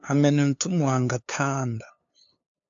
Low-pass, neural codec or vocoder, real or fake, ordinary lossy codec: 7.2 kHz; codec, 16 kHz, 2 kbps, FunCodec, trained on LibriTTS, 25 frames a second; fake; AAC, 64 kbps